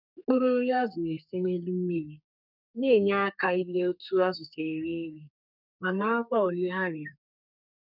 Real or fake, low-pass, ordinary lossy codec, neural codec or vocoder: fake; 5.4 kHz; none; codec, 32 kHz, 1.9 kbps, SNAC